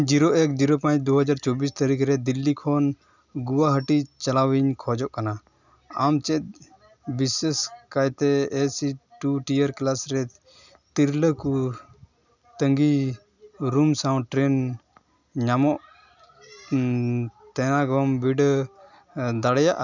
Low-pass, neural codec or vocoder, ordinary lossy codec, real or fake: 7.2 kHz; none; none; real